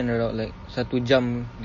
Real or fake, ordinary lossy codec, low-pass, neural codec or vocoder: real; MP3, 32 kbps; 7.2 kHz; none